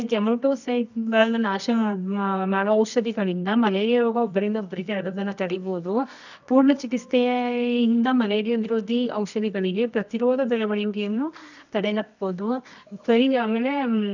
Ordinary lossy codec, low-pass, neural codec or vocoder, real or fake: none; 7.2 kHz; codec, 24 kHz, 0.9 kbps, WavTokenizer, medium music audio release; fake